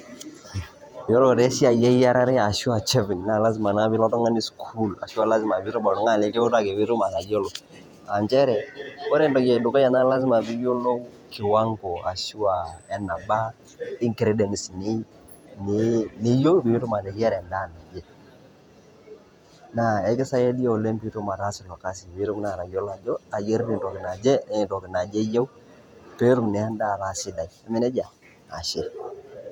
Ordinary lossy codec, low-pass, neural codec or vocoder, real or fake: none; 19.8 kHz; vocoder, 48 kHz, 128 mel bands, Vocos; fake